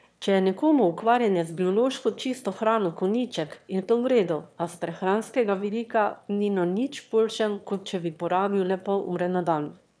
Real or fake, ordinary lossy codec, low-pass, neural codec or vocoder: fake; none; none; autoencoder, 22.05 kHz, a latent of 192 numbers a frame, VITS, trained on one speaker